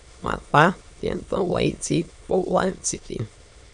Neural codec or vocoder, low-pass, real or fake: autoencoder, 22.05 kHz, a latent of 192 numbers a frame, VITS, trained on many speakers; 9.9 kHz; fake